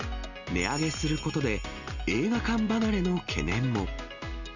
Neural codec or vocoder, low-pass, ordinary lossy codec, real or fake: none; 7.2 kHz; none; real